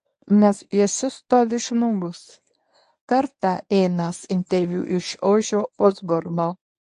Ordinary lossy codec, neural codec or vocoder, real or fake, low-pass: AAC, 64 kbps; codec, 24 kHz, 0.9 kbps, WavTokenizer, medium speech release version 1; fake; 10.8 kHz